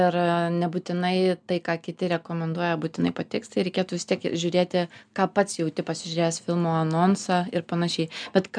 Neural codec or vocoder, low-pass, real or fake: none; 9.9 kHz; real